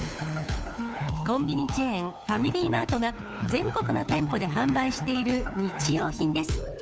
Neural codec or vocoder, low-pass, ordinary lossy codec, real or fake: codec, 16 kHz, 4 kbps, FunCodec, trained on LibriTTS, 50 frames a second; none; none; fake